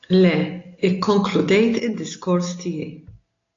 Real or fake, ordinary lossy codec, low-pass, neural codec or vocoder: real; AAC, 32 kbps; 7.2 kHz; none